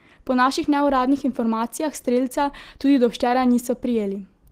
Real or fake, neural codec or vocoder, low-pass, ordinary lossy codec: real; none; 14.4 kHz; Opus, 16 kbps